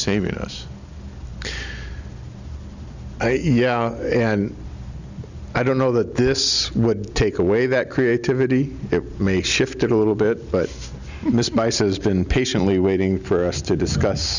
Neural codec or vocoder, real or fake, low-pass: none; real; 7.2 kHz